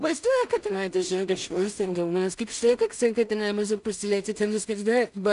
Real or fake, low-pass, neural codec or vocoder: fake; 10.8 kHz; codec, 16 kHz in and 24 kHz out, 0.4 kbps, LongCat-Audio-Codec, two codebook decoder